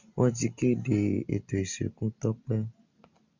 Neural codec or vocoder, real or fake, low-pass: none; real; 7.2 kHz